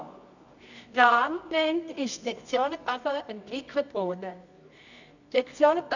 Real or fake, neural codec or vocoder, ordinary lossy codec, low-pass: fake; codec, 24 kHz, 0.9 kbps, WavTokenizer, medium music audio release; none; 7.2 kHz